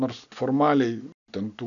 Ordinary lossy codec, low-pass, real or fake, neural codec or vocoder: MP3, 96 kbps; 7.2 kHz; real; none